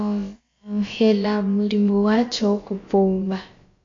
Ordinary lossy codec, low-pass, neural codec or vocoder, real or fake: AAC, 48 kbps; 7.2 kHz; codec, 16 kHz, about 1 kbps, DyCAST, with the encoder's durations; fake